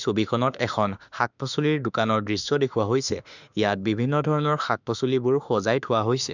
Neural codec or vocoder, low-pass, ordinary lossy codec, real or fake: autoencoder, 48 kHz, 32 numbers a frame, DAC-VAE, trained on Japanese speech; 7.2 kHz; none; fake